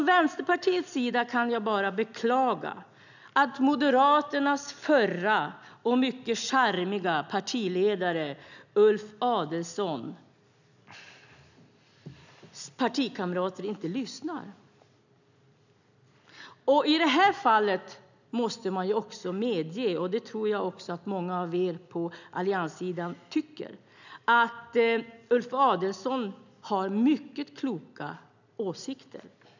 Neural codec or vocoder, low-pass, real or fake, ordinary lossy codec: none; 7.2 kHz; real; none